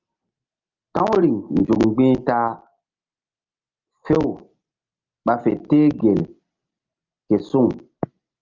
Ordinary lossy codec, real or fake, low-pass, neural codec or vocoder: Opus, 24 kbps; real; 7.2 kHz; none